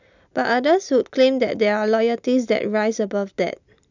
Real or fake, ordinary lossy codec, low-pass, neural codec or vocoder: real; none; 7.2 kHz; none